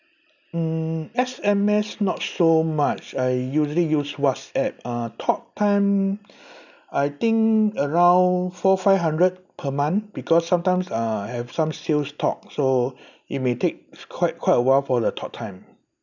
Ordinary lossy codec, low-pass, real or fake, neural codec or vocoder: none; 7.2 kHz; real; none